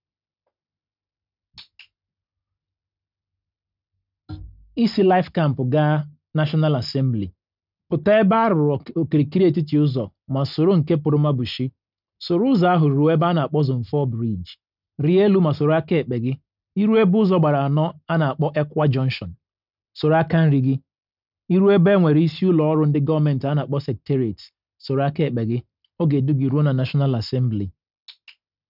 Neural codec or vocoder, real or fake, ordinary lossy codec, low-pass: none; real; none; 5.4 kHz